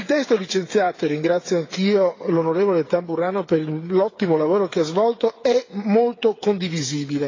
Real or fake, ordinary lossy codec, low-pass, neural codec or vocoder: fake; AAC, 32 kbps; 7.2 kHz; codec, 16 kHz, 8 kbps, FreqCodec, smaller model